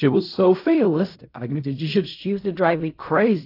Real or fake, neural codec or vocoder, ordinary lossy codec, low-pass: fake; codec, 16 kHz in and 24 kHz out, 0.4 kbps, LongCat-Audio-Codec, fine tuned four codebook decoder; AAC, 32 kbps; 5.4 kHz